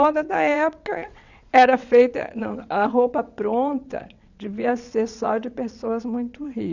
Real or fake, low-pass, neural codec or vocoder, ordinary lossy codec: fake; 7.2 kHz; vocoder, 22.05 kHz, 80 mel bands, WaveNeXt; none